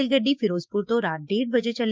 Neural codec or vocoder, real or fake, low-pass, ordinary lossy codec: codec, 16 kHz, 6 kbps, DAC; fake; none; none